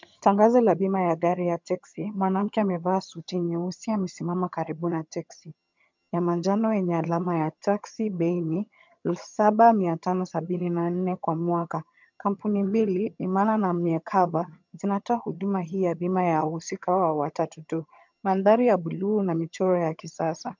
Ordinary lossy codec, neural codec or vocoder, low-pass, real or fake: MP3, 64 kbps; vocoder, 22.05 kHz, 80 mel bands, HiFi-GAN; 7.2 kHz; fake